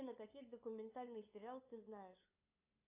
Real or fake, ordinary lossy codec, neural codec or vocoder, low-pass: fake; AAC, 32 kbps; codec, 16 kHz, 8 kbps, FunCodec, trained on LibriTTS, 25 frames a second; 3.6 kHz